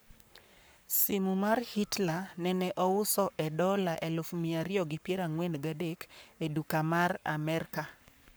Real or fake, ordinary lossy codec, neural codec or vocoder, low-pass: fake; none; codec, 44.1 kHz, 7.8 kbps, Pupu-Codec; none